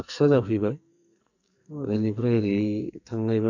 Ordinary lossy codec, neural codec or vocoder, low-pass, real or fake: none; codec, 44.1 kHz, 2.6 kbps, SNAC; 7.2 kHz; fake